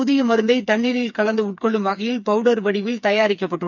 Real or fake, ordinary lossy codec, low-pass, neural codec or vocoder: fake; none; 7.2 kHz; codec, 16 kHz, 4 kbps, FreqCodec, smaller model